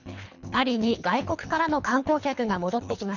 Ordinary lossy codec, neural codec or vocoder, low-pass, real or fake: none; codec, 24 kHz, 3 kbps, HILCodec; 7.2 kHz; fake